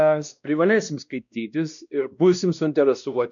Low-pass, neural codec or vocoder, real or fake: 7.2 kHz; codec, 16 kHz, 1 kbps, X-Codec, WavLM features, trained on Multilingual LibriSpeech; fake